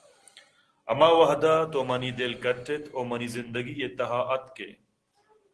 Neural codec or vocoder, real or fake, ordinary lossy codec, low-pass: none; real; Opus, 16 kbps; 10.8 kHz